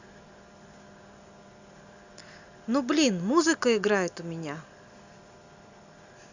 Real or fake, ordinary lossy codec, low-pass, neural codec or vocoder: real; Opus, 64 kbps; 7.2 kHz; none